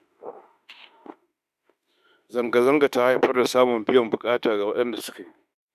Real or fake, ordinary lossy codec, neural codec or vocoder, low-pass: fake; none; autoencoder, 48 kHz, 32 numbers a frame, DAC-VAE, trained on Japanese speech; 14.4 kHz